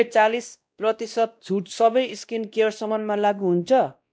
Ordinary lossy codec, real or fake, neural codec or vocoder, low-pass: none; fake; codec, 16 kHz, 1 kbps, X-Codec, WavLM features, trained on Multilingual LibriSpeech; none